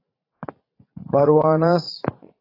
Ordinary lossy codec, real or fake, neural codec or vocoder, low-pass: AAC, 24 kbps; fake; vocoder, 44.1 kHz, 80 mel bands, Vocos; 5.4 kHz